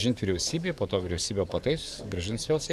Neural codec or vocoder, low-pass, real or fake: codec, 44.1 kHz, 7.8 kbps, DAC; 14.4 kHz; fake